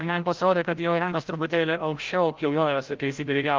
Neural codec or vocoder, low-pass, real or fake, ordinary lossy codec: codec, 16 kHz, 0.5 kbps, FreqCodec, larger model; 7.2 kHz; fake; Opus, 32 kbps